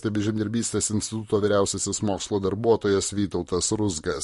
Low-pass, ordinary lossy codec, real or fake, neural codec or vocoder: 14.4 kHz; MP3, 48 kbps; fake; vocoder, 44.1 kHz, 128 mel bands, Pupu-Vocoder